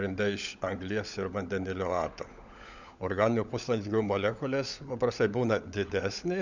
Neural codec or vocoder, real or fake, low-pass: codec, 16 kHz, 16 kbps, FunCodec, trained on LibriTTS, 50 frames a second; fake; 7.2 kHz